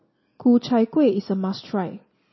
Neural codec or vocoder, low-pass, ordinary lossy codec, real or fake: none; 7.2 kHz; MP3, 24 kbps; real